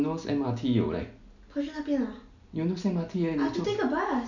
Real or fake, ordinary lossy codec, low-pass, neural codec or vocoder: real; none; 7.2 kHz; none